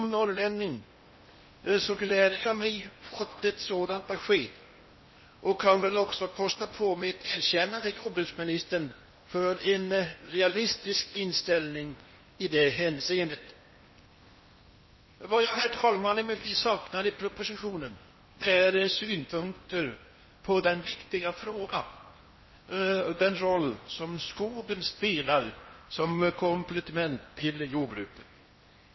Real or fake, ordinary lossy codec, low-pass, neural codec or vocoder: fake; MP3, 24 kbps; 7.2 kHz; codec, 16 kHz in and 24 kHz out, 0.8 kbps, FocalCodec, streaming, 65536 codes